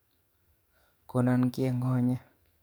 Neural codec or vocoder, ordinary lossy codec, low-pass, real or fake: codec, 44.1 kHz, 7.8 kbps, DAC; none; none; fake